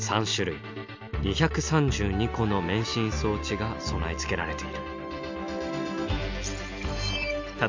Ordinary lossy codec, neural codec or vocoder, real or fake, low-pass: MP3, 64 kbps; none; real; 7.2 kHz